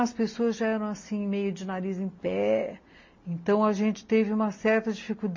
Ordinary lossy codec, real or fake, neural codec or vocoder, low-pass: MP3, 32 kbps; real; none; 7.2 kHz